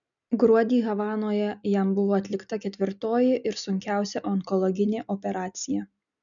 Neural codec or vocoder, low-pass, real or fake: none; 7.2 kHz; real